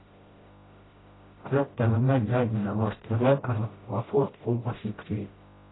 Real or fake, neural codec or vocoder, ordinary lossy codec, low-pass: fake; codec, 16 kHz, 0.5 kbps, FreqCodec, smaller model; AAC, 16 kbps; 7.2 kHz